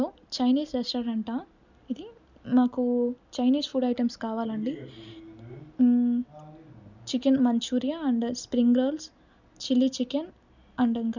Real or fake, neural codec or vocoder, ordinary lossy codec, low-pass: real; none; none; 7.2 kHz